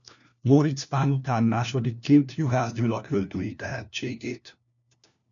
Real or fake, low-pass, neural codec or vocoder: fake; 7.2 kHz; codec, 16 kHz, 1 kbps, FunCodec, trained on LibriTTS, 50 frames a second